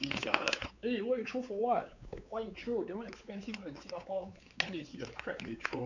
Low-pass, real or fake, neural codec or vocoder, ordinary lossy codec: 7.2 kHz; fake; codec, 16 kHz, 4 kbps, X-Codec, WavLM features, trained on Multilingual LibriSpeech; none